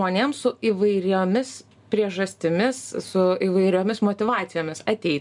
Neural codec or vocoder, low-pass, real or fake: none; 10.8 kHz; real